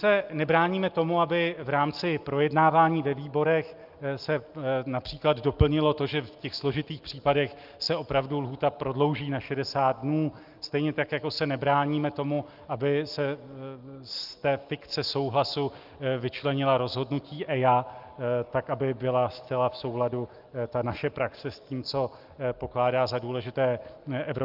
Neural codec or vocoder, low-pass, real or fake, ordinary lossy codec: none; 5.4 kHz; real; Opus, 24 kbps